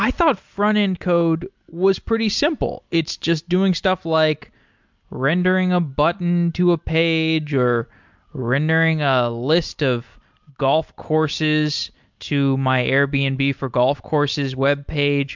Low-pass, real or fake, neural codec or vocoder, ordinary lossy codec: 7.2 kHz; real; none; MP3, 64 kbps